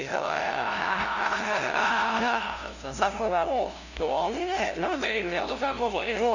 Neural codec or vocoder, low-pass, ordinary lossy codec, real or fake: codec, 16 kHz, 0.5 kbps, FunCodec, trained on LibriTTS, 25 frames a second; 7.2 kHz; none; fake